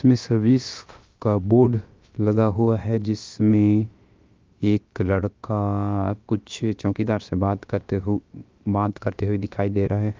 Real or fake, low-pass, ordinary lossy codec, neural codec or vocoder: fake; 7.2 kHz; Opus, 32 kbps; codec, 16 kHz, about 1 kbps, DyCAST, with the encoder's durations